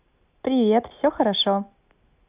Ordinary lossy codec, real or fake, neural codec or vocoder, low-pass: Opus, 64 kbps; real; none; 3.6 kHz